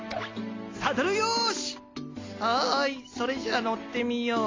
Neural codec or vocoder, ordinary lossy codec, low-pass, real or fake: none; AAC, 32 kbps; 7.2 kHz; real